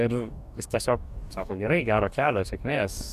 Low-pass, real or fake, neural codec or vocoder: 14.4 kHz; fake; codec, 44.1 kHz, 2.6 kbps, DAC